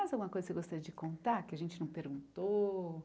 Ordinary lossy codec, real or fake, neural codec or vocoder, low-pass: none; real; none; none